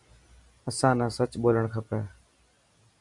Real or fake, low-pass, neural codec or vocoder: real; 10.8 kHz; none